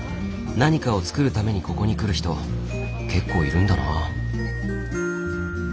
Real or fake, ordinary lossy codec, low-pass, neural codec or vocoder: real; none; none; none